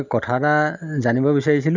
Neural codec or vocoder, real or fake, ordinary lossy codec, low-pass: none; real; none; 7.2 kHz